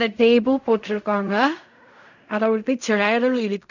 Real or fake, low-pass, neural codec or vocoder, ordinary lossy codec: fake; 7.2 kHz; codec, 16 kHz in and 24 kHz out, 0.4 kbps, LongCat-Audio-Codec, fine tuned four codebook decoder; none